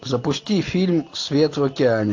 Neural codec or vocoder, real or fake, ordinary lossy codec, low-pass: none; real; AAC, 48 kbps; 7.2 kHz